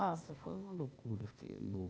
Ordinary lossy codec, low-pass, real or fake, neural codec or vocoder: none; none; fake; codec, 16 kHz, 0.8 kbps, ZipCodec